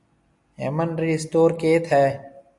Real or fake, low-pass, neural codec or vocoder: real; 10.8 kHz; none